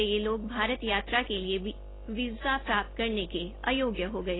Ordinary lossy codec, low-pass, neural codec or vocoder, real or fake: AAC, 16 kbps; 7.2 kHz; none; real